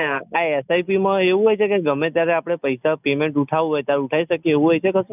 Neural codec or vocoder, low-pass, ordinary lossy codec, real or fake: none; 3.6 kHz; none; real